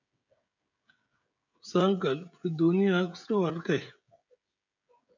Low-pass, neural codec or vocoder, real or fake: 7.2 kHz; codec, 16 kHz, 16 kbps, FreqCodec, smaller model; fake